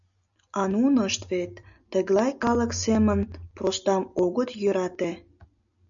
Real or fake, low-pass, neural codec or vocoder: real; 7.2 kHz; none